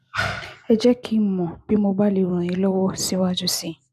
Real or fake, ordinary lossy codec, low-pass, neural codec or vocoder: real; none; 14.4 kHz; none